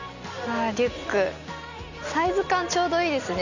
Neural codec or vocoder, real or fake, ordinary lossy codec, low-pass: none; real; none; 7.2 kHz